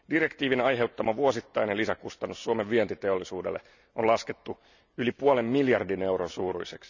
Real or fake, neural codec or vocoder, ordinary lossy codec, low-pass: real; none; none; 7.2 kHz